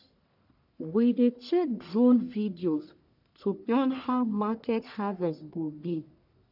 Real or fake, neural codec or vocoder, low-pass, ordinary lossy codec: fake; codec, 44.1 kHz, 1.7 kbps, Pupu-Codec; 5.4 kHz; none